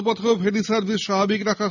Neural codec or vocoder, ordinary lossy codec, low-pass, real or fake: none; none; 7.2 kHz; real